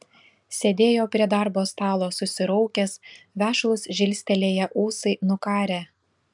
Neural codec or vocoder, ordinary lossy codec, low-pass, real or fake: none; MP3, 96 kbps; 10.8 kHz; real